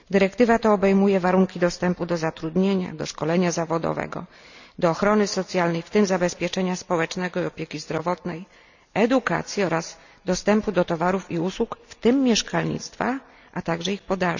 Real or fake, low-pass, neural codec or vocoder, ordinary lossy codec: real; 7.2 kHz; none; none